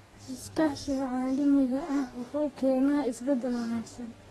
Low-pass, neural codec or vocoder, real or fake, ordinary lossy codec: 19.8 kHz; codec, 44.1 kHz, 2.6 kbps, DAC; fake; AAC, 32 kbps